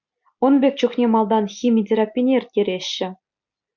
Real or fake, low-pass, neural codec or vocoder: real; 7.2 kHz; none